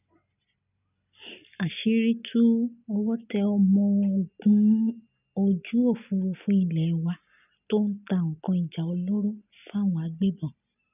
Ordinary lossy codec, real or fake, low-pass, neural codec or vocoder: none; real; 3.6 kHz; none